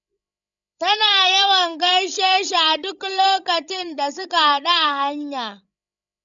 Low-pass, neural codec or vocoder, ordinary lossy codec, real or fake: 7.2 kHz; codec, 16 kHz, 16 kbps, FreqCodec, larger model; none; fake